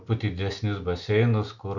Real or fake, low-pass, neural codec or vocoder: real; 7.2 kHz; none